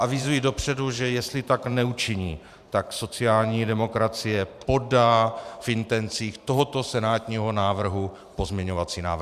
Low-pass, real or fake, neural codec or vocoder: 14.4 kHz; fake; vocoder, 44.1 kHz, 128 mel bands every 512 samples, BigVGAN v2